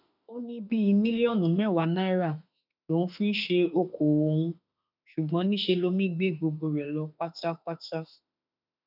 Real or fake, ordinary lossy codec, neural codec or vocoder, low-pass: fake; none; autoencoder, 48 kHz, 32 numbers a frame, DAC-VAE, trained on Japanese speech; 5.4 kHz